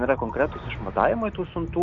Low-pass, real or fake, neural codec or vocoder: 7.2 kHz; real; none